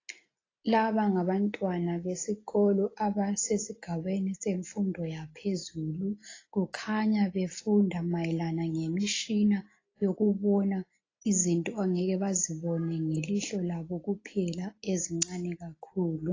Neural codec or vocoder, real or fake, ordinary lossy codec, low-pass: none; real; AAC, 32 kbps; 7.2 kHz